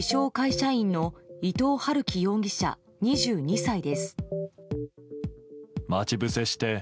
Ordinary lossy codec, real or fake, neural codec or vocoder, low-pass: none; real; none; none